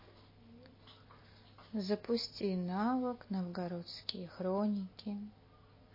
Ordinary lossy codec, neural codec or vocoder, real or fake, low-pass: MP3, 24 kbps; none; real; 5.4 kHz